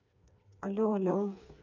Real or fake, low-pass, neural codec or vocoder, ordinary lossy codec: fake; 7.2 kHz; codec, 16 kHz, 4 kbps, FreqCodec, smaller model; none